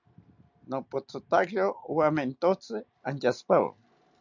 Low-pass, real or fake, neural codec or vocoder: 7.2 kHz; real; none